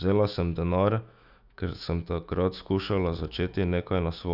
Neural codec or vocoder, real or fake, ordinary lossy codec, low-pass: autoencoder, 48 kHz, 128 numbers a frame, DAC-VAE, trained on Japanese speech; fake; none; 5.4 kHz